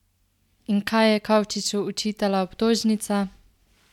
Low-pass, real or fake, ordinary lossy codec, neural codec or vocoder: 19.8 kHz; real; none; none